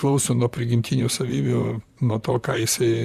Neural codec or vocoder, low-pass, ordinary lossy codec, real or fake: vocoder, 44.1 kHz, 128 mel bands, Pupu-Vocoder; 14.4 kHz; Opus, 64 kbps; fake